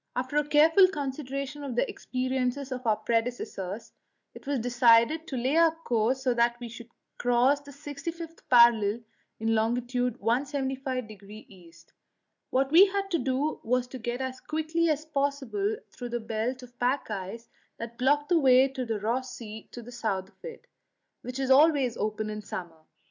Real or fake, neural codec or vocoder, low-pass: real; none; 7.2 kHz